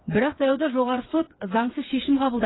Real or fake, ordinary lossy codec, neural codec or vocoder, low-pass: fake; AAC, 16 kbps; codec, 16 kHz, 4 kbps, FreqCodec, smaller model; 7.2 kHz